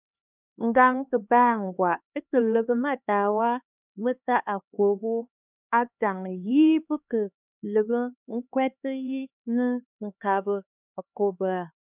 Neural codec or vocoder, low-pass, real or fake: codec, 16 kHz, 2 kbps, X-Codec, HuBERT features, trained on LibriSpeech; 3.6 kHz; fake